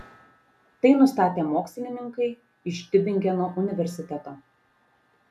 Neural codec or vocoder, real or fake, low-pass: none; real; 14.4 kHz